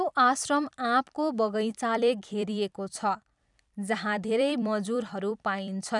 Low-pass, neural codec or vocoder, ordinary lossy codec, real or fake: 10.8 kHz; vocoder, 44.1 kHz, 128 mel bands every 256 samples, BigVGAN v2; none; fake